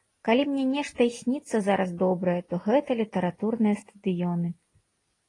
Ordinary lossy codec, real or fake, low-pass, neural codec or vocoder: AAC, 32 kbps; real; 10.8 kHz; none